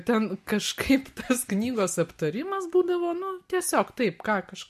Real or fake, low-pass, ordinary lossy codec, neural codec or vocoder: real; 14.4 kHz; MP3, 64 kbps; none